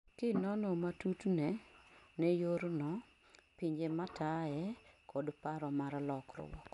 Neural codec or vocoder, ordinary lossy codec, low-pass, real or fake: none; none; 10.8 kHz; real